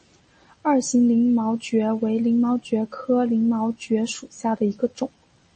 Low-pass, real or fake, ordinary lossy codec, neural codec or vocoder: 10.8 kHz; real; MP3, 32 kbps; none